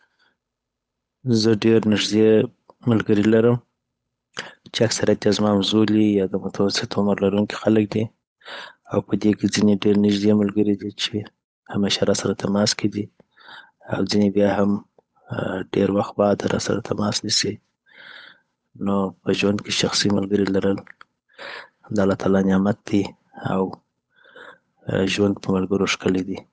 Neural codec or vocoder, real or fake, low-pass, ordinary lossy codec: codec, 16 kHz, 8 kbps, FunCodec, trained on Chinese and English, 25 frames a second; fake; none; none